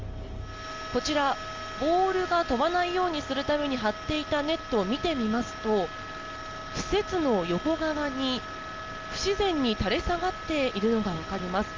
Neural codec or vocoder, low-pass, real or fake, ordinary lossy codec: none; 7.2 kHz; real; Opus, 32 kbps